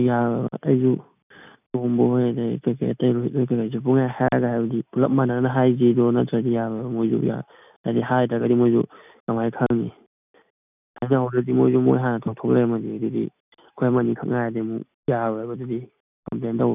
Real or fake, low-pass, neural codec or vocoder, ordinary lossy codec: real; 3.6 kHz; none; none